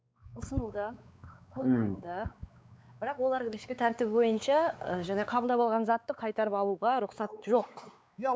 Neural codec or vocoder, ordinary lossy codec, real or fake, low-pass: codec, 16 kHz, 4 kbps, X-Codec, WavLM features, trained on Multilingual LibriSpeech; none; fake; none